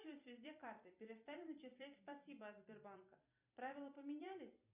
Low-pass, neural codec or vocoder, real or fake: 3.6 kHz; vocoder, 44.1 kHz, 128 mel bands every 256 samples, BigVGAN v2; fake